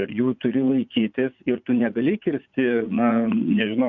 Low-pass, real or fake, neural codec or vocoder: 7.2 kHz; fake; vocoder, 44.1 kHz, 80 mel bands, Vocos